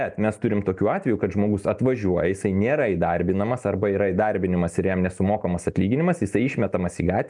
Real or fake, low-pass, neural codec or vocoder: real; 10.8 kHz; none